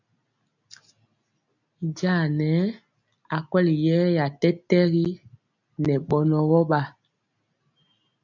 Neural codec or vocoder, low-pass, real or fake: none; 7.2 kHz; real